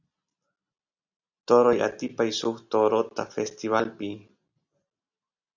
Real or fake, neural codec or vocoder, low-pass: real; none; 7.2 kHz